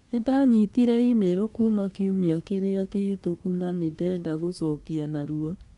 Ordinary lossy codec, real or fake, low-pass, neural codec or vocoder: none; fake; 10.8 kHz; codec, 24 kHz, 1 kbps, SNAC